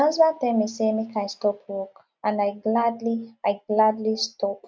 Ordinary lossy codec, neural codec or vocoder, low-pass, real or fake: none; none; none; real